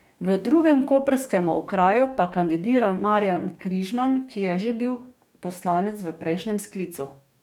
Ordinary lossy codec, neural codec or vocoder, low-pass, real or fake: none; codec, 44.1 kHz, 2.6 kbps, DAC; 19.8 kHz; fake